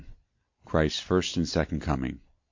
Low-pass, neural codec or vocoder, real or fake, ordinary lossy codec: 7.2 kHz; none; real; MP3, 48 kbps